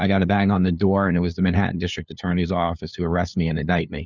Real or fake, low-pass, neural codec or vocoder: fake; 7.2 kHz; codec, 16 kHz, 2 kbps, FunCodec, trained on LibriTTS, 25 frames a second